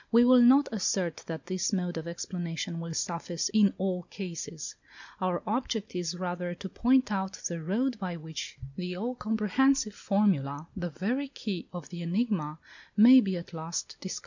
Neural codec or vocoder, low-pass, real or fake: none; 7.2 kHz; real